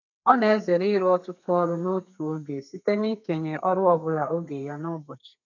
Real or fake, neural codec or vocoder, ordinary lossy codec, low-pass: fake; codec, 32 kHz, 1.9 kbps, SNAC; none; 7.2 kHz